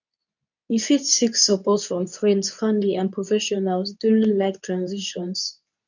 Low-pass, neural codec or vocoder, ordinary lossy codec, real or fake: 7.2 kHz; codec, 24 kHz, 0.9 kbps, WavTokenizer, medium speech release version 2; none; fake